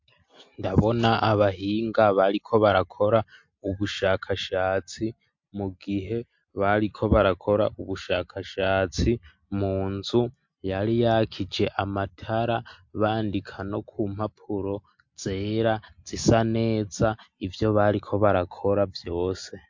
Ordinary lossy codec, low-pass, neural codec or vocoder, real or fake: MP3, 48 kbps; 7.2 kHz; none; real